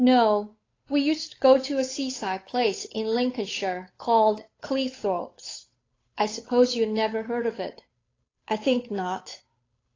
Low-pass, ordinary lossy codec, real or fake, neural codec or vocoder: 7.2 kHz; AAC, 32 kbps; fake; codec, 16 kHz, 8 kbps, FunCodec, trained on Chinese and English, 25 frames a second